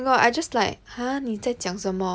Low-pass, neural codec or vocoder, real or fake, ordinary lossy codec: none; none; real; none